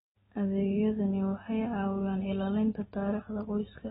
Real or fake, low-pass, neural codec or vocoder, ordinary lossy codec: real; 19.8 kHz; none; AAC, 16 kbps